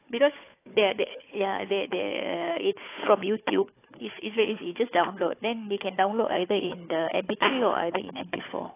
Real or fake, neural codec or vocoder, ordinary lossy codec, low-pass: fake; codec, 16 kHz, 4 kbps, FunCodec, trained on Chinese and English, 50 frames a second; AAC, 24 kbps; 3.6 kHz